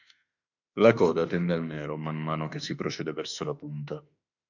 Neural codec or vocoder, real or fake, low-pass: autoencoder, 48 kHz, 32 numbers a frame, DAC-VAE, trained on Japanese speech; fake; 7.2 kHz